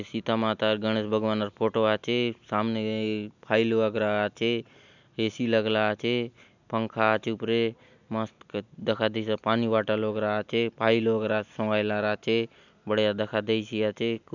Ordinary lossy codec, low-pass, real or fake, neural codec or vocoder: none; 7.2 kHz; real; none